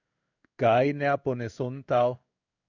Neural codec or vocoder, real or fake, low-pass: codec, 16 kHz in and 24 kHz out, 1 kbps, XY-Tokenizer; fake; 7.2 kHz